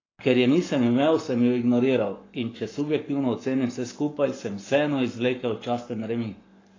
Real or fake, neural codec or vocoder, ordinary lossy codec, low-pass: fake; codec, 44.1 kHz, 7.8 kbps, Pupu-Codec; AAC, 32 kbps; 7.2 kHz